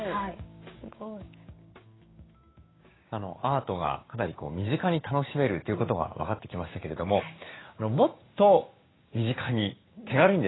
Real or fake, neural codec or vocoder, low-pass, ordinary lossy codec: fake; codec, 16 kHz, 6 kbps, DAC; 7.2 kHz; AAC, 16 kbps